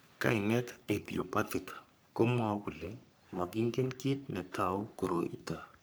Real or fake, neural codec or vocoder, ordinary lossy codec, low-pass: fake; codec, 44.1 kHz, 3.4 kbps, Pupu-Codec; none; none